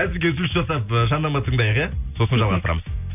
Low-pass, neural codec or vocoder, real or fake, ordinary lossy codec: 3.6 kHz; none; real; none